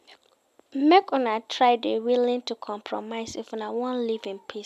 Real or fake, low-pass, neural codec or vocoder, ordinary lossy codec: real; 14.4 kHz; none; none